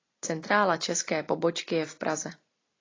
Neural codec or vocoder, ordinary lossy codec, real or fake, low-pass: none; AAC, 32 kbps; real; 7.2 kHz